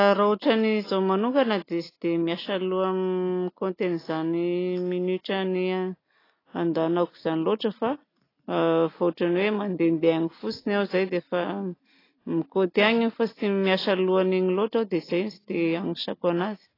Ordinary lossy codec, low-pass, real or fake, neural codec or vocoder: AAC, 24 kbps; 5.4 kHz; real; none